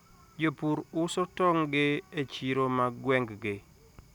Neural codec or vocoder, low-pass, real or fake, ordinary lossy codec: none; 19.8 kHz; real; none